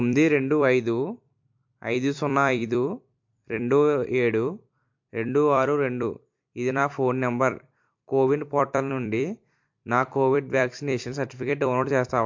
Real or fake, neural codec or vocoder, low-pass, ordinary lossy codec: fake; vocoder, 44.1 kHz, 128 mel bands every 256 samples, BigVGAN v2; 7.2 kHz; MP3, 48 kbps